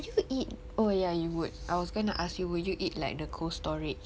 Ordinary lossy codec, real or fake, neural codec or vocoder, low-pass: none; real; none; none